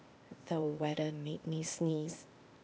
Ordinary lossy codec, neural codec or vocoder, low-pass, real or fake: none; codec, 16 kHz, 0.8 kbps, ZipCodec; none; fake